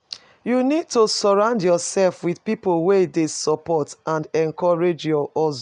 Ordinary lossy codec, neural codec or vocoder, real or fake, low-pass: none; none; real; 9.9 kHz